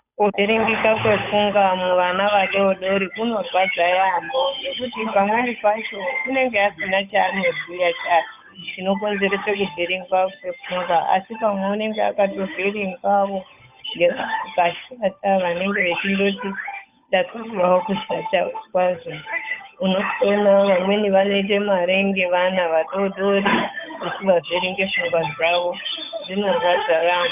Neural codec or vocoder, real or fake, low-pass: codec, 16 kHz, 8 kbps, FunCodec, trained on Chinese and English, 25 frames a second; fake; 3.6 kHz